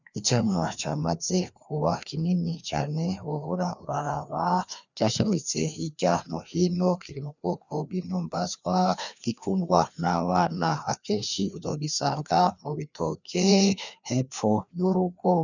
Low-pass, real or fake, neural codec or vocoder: 7.2 kHz; fake; codec, 16 kHz, 2 kbps, FreqCodec, larger model